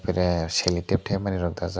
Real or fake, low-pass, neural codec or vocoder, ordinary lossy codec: real; none; none; none